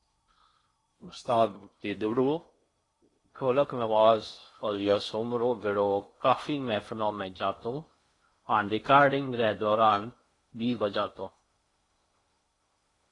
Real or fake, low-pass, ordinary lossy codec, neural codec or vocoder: fake; 10.8 kHz; AAC, 32 kbps; codec, 16 kHz in and 24 kHz out, 0.6 kbps, FocalCodec, streaming, 4096 codes